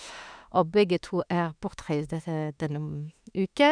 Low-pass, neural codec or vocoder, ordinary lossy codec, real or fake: 9.9 kHz; autoencoder, 48 kHz, 32 numbers a frame, DAC-VAE, trained on Japanese speech; none; fake